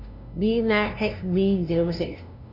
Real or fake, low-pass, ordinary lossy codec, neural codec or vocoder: fake; 5.4 kHz; none; codec, 16 kHz, 0.5 kbps, FunCodec, trained on LibriTTS, 25 frames a second